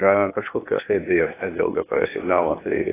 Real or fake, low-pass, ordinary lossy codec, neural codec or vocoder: fake; 3.6 kHz; AAC, 16 kbps; codec, 16 kHz, 0.8 kbps, ZipCodec